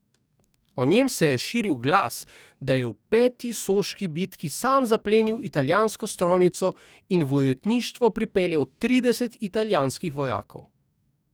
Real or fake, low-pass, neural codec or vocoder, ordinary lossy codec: fake; none; codec, 44.1 kHz, 2.6 kbps, DAC; none